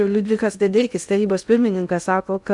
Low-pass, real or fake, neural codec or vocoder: 10.8 kHz; fake; codec, 16 kHz in and 24 kHz out, 0.6 kbps, FocalCodec, streaming, 2048 codes